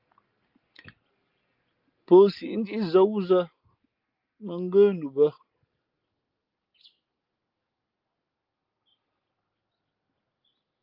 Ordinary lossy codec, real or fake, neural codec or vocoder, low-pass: Opus, 24 kbps; real; none; 5.4 kHz